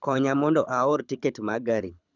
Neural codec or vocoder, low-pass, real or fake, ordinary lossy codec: codec, 24 kHz, 6 kbps, HILCodec; 7.2 kHz; fake; none